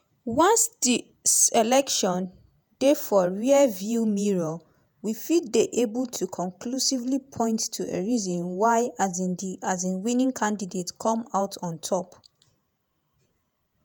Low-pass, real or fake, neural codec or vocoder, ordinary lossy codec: none; fake; vocoder, 48 kHz, 128 mel bands, Vocos; none